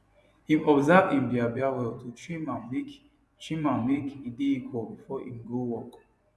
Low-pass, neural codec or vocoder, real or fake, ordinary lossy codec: none; vocoder, 24 kHz, 100 mel bands, Vocos; fake; none